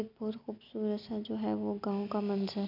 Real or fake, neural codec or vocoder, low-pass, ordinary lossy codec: real; none; 5.4 kHz; none